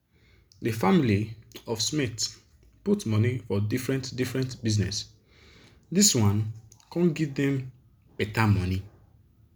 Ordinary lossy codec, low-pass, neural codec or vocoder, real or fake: none; none; none; real